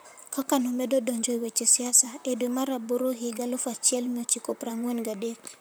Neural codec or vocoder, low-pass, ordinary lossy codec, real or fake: vocoder, 44.1 kHz, 128 mel bands, Pupu-Vocoder; none; none; fake